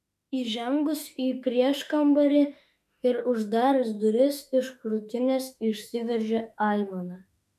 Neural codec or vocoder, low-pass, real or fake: autoencoder, 48 kHz, 32 numbers a frame, DAC-VAE, trained on Japanese speech; 14.4 kHz; fake